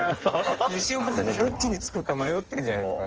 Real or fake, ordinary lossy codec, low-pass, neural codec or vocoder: fake; Opus, 24 kbps; 7.2 kHz; codec, 16 kHz in and 24 kHz out, 1.1 kbps, FireRedTTS-2 codec